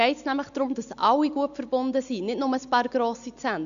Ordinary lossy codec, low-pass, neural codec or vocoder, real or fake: none; 7.2 kHz; none; real